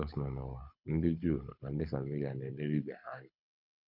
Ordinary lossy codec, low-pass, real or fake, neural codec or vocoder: none; 5.4 kHz; fake; codec, 24 kHz, 6 kbps, HILCodec